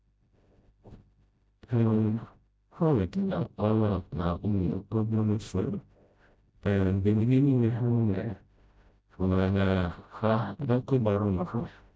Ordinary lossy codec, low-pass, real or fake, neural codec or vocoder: none; none; fake; codec, 16 kHz, 0.5 kbps, FreqCodec, smaller model